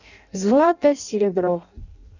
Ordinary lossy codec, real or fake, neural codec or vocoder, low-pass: none; fake; codec, 16 kHz in and 24 kHz out, 0.6 kbps, FireRedTTS-2 codec; 7.2 kHz